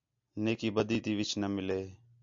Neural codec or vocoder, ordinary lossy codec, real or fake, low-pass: none; AAC, 64 kbps; real; 7.2 kHz